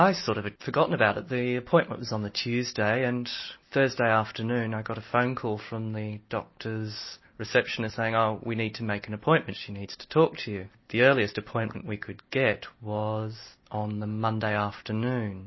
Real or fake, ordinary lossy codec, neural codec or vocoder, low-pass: real; MP3, 24 kbps; none; 7.2 kHz